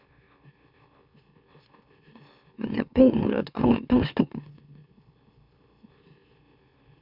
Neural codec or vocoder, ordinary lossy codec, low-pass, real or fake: autoencoder, 44.1 kHz, a latent of 192 numbers a frame, MeloTTS; none; 5.4 kHz; fake